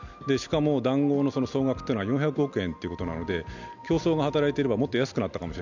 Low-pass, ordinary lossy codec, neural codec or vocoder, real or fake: 7.2 kHz; none; none; real